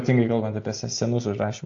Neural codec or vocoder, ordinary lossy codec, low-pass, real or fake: none; AAC, 48 kbps; 7.2 kHz; real